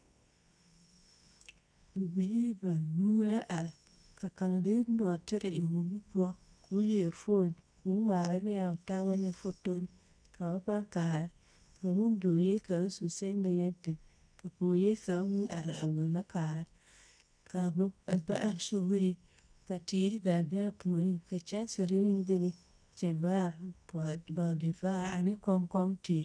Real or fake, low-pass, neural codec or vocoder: fake; 9.9 kHz; codec, 24 kHz, 0.9 kbps, WavTokenizer, medium music audio release